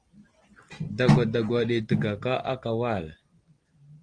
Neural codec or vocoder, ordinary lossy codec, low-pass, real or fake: none; Opus, 24 kbps; 9.9 kHz; real